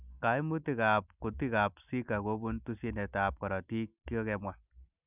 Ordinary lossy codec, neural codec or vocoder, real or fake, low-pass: none; none; real; 3.6 kHz